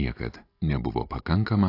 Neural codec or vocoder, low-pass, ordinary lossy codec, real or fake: none; 5.4 kHz; AAC, 32 kbps; real